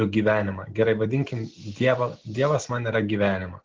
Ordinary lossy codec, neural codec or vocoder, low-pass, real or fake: Opus, 16 kbps; none; 7.2 kHz; real